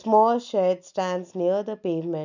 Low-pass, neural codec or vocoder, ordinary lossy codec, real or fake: 7.2 kHz; none; none; real